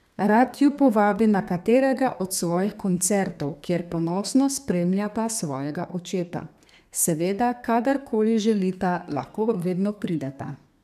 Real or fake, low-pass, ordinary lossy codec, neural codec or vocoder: fake; 14.4 kHz; none; codec, 32 kHz, 1.9 kbps, SNAC